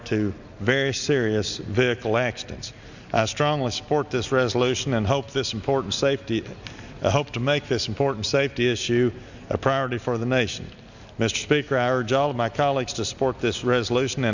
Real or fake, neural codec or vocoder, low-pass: real; none; 7.2 kHz